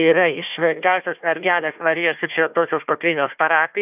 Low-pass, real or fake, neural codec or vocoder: 3.6 kHz; fake; codec, 16 kHz, 1 kbps, FunCodec, trained on Chinese and English, 50 frames a second